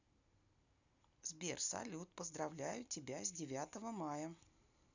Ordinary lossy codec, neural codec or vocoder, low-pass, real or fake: none; none; 7.2 kHz; real